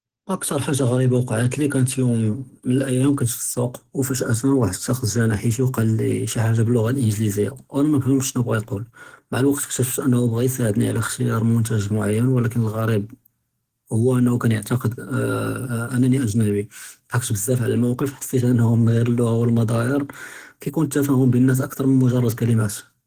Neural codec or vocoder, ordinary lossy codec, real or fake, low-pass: codec, 44.1 kHz, 7.8 kbps, DAC; Opus, 16 kbps; fake; 14.4 kHz